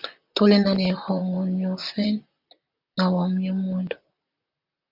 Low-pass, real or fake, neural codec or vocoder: 5.4 kHz; fake; vocoder, 44.1 kHz, 128 mel bands, Pupu-Vocoder